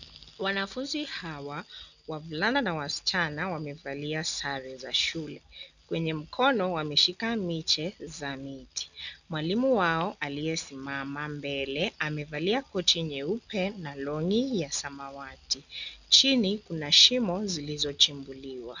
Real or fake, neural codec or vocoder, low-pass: real; none; 7.2 kHz